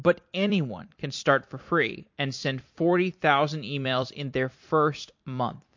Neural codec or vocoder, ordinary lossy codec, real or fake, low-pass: vocoder, 44.1 kHz, 128 mel bands every 256 samples, BigVGAN v2; MP3, 48 kbps; fake; 7.2 kHz